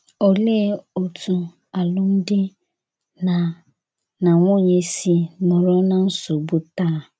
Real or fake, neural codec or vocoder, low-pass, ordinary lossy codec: real; none; none; none